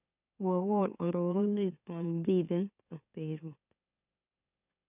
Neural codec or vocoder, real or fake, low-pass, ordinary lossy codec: autoencoder, 44.1 kHz, a latent of 192 numbers a frame, MeloTTS; fake; 3.6 kHz; none